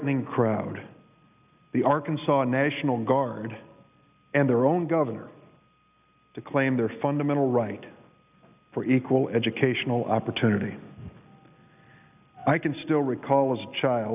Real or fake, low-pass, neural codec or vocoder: real; 3.6 kHz; none